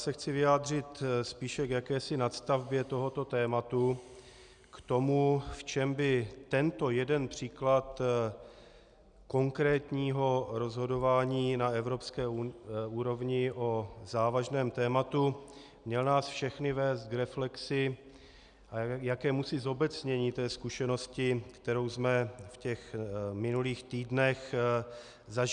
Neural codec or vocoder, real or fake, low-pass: none; real; 9.9 kHz